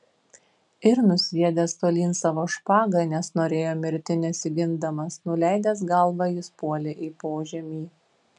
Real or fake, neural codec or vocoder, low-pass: real; none; 10.8 kHz